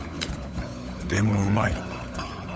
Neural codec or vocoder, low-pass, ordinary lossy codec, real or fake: codec, 16 kHz, 16 kbps, FunCodec, trained on LibriTTS, 50 frames a second; none; none; fake